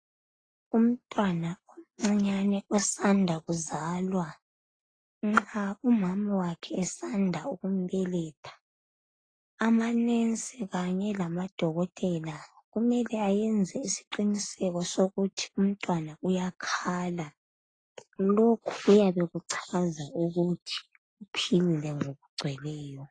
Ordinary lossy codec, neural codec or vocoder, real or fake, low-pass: AAC, 32 kbps; none; real; 9.9 kHz